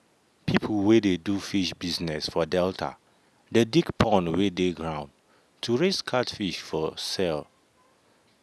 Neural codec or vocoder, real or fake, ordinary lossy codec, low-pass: none; real; none; none